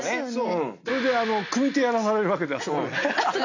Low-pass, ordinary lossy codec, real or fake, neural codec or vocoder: 7.2 kHz; AAC, 48 kbps; real; none